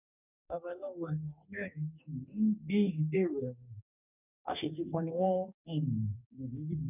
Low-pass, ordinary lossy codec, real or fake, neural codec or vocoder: 3.6 kHz; none; fake; codec, 44.1 kHz, 2.6 kbps, DAC